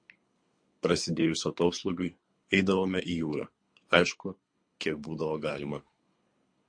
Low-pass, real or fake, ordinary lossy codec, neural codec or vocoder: 9.9 kHz; fake; MP3, 48 kbps; codec, 24 kHz, 3 kbps, HILCodec